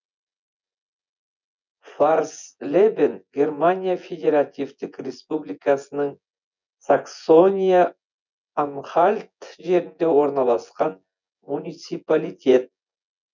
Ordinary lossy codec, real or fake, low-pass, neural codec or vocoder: none; fake; 7.2 kHz; vocoder, 24 kHz, 100 mel bands, Vocos